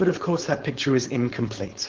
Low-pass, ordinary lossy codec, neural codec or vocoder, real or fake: 7.2 kHz; Opus, 16 kbps; codec, 16 kHz, 4.8 kbps, FACodec; fake